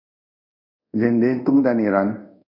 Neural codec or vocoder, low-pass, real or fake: codec, 24 kHz, 0.5 kbps, DualCodec; 5.4 kHz; fake